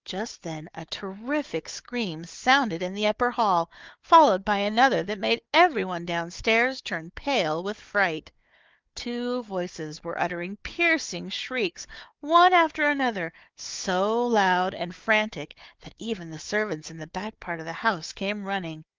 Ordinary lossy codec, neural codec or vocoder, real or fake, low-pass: Opus, 32 kbps; codec, 16 kHz, 4 kbps, FunCodec, trained on Chinese and English, 50 frames a second; fake; 7.2 kHz